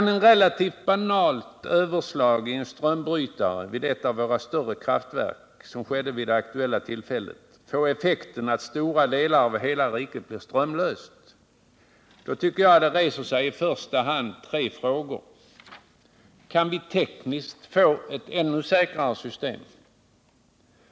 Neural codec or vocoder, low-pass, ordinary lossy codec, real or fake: none; none; none; real